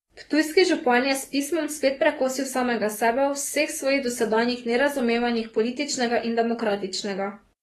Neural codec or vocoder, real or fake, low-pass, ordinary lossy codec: codec, 44.1 kHz, 7.8 kbps, DAC; fake; 19.8 kHz; AAC, 32 kbps